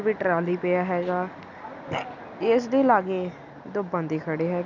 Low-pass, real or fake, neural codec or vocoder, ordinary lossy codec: 7.2 kHz; real; none; none